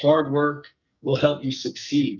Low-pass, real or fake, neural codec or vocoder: 7.2 kHz; fake; codec, 44.1 kHz, 2.6 kbps, SNAC